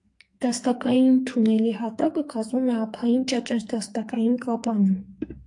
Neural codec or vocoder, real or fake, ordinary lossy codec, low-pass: codec, 44.1 kHz, 2.6 kbps, SNAC; fake; AAC, 64 kbps; 10.8 kHz